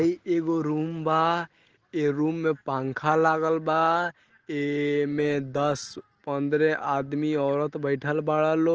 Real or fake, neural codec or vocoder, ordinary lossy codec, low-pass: real; none; Opus, 16 kbps; 7.2 kHz